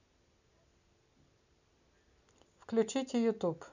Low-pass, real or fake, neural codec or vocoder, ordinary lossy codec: 7.2 kHz; real; none; none